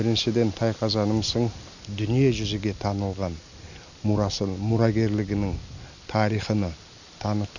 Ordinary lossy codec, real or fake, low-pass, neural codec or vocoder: none; real; 7.2 kHz; none